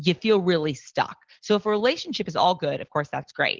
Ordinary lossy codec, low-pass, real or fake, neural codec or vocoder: Opus, 16 kbps; 7.2 kHz; real; none